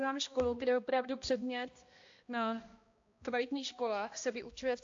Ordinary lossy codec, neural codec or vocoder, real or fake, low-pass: AAC, 48 kbps; codec, 16 kHz, 1 kbps, X-Codec, HuBERT features, trained on balanced general audio; fake; 7.2 kHz